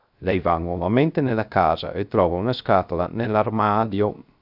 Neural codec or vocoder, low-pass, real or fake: codec, 16 kHz, 0.3 kbps, FocalCodec; 5.4 kHz; fake